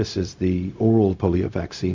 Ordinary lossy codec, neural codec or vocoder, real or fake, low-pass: MP3, 64 kbps; codec, 16 kHz, 0.4 kbps, LongCat-Audio-Codec; fake; 7.2 kHz